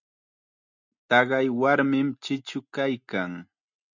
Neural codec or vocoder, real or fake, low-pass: none; real; 7.2 kHz